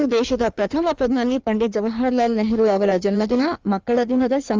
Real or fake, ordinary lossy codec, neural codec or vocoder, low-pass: fake; Opus, 32 kbps; codec, 16 kHz in and 24 kHz out, 1.1 kbps, FireRedTTS-2 codec; 7.2 kHz